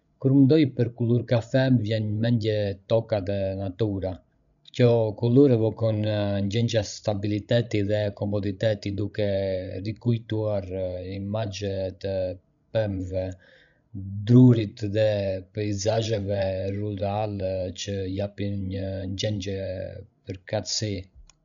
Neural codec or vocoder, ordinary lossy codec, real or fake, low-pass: codec, 16 kHz, 16 kbps, FreqCodec, larger model; MP3, 96 kbps; fake; 7.2 kHz